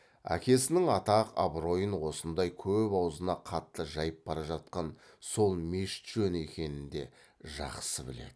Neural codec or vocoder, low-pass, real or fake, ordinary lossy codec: none; none; real; none